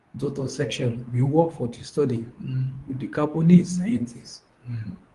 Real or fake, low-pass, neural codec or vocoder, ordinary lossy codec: fake; 10.8 kHz; codec, 24 kHz, 0.9 kbps, WavTokenizer, medium speech release version 2; Opus, 24 kbps